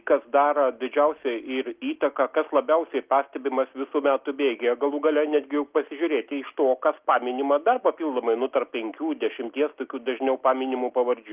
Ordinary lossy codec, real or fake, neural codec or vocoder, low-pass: Opus, 32 kbps; real; none; 3.6 kHz